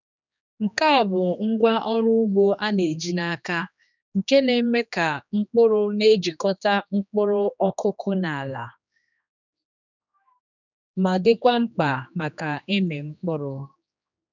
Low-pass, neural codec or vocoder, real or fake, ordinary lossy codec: 7.2 kHz; codec, 16 kHz, 2 kbps, X-Codec, HuBERT features, trained on general audio; fake; none